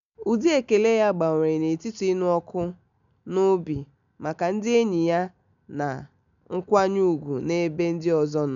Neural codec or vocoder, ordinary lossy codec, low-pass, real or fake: none; none; 7.2 kHz; real